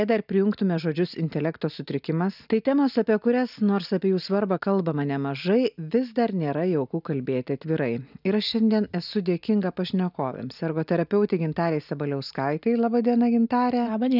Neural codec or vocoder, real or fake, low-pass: none; real; 5.4 kHz